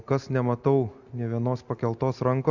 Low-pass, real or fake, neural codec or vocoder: 7.2 kHz; real; none